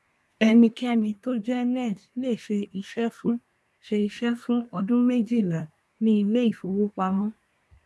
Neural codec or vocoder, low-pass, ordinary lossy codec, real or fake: codec, 24 kHz, 1 kbps, SNAC; none; none; fake